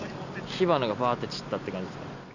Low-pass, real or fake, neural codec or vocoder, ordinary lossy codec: 7.2 kHz; real; none; none